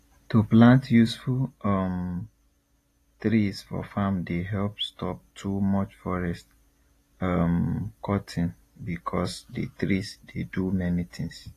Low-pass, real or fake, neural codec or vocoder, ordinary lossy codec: 14.4 kHz; real; none; AAC, 48 kbps